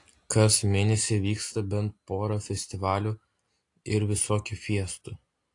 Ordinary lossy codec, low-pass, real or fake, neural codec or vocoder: AAC, 48 kbps; 10.8 kHz; real; none